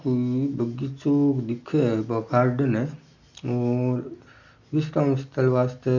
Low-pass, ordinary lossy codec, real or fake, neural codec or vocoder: 7.2 kHz; none; real; none